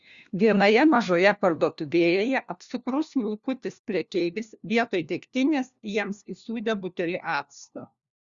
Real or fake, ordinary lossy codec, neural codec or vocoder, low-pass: fake; Opus, 64 kbps; codec, 16 kHz, 1 kbps, FunCodec, trained on LibriTTS, 50 frames a second; 7.2 kHz